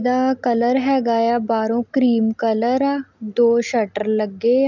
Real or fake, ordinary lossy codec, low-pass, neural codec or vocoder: real; none; 7.2 kHz; none